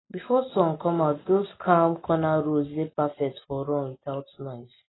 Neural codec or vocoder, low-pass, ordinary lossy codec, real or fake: none; 7.2 kHz; AAC, 16 kbps; real